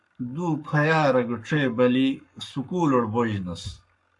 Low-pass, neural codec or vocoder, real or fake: 10.8 kHz; codec, 44.1 kHz, 7.8 kbps, Pupu-Codec; fake